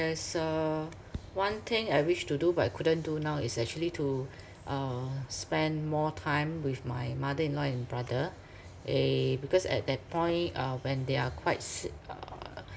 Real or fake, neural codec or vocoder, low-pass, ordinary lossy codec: real; none; none; none